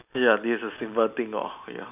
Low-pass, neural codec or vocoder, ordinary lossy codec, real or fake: 3.6 kHz; none; none; real